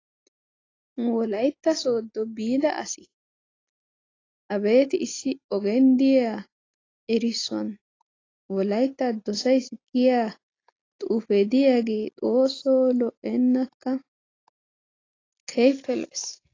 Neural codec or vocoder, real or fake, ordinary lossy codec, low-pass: none; real; AAC, 32 kbps; 7.2 kHz